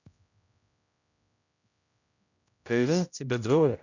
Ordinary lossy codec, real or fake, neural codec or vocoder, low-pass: none; fake; codec, 16 kHz, 0.5 kbps, X-Codec, HuBERT features, trained on general audio; 7.2 kHz